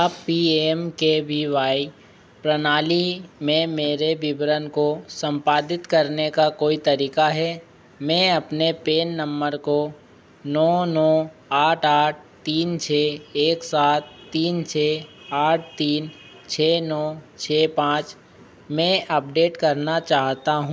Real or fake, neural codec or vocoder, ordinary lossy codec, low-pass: real; none; none; none